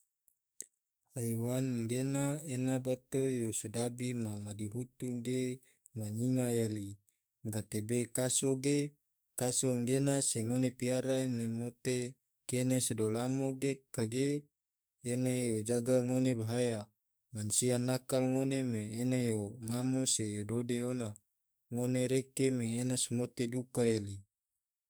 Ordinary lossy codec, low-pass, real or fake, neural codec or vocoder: none; none; fake; codec, 44.1 kHz, 2.6 kbps, SNAC